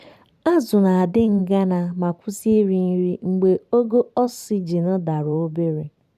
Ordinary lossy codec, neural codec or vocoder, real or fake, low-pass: none; vocoder, 44.1 kHz, 128 mel bands every 512 samples, BigVGAN v2; fake; 14.4 kHz